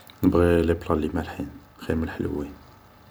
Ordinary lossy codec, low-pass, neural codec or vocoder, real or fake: none; none; vocoder, 44.1 kHz, 128 mel bands every 256 samples, BigVGAN v2; fake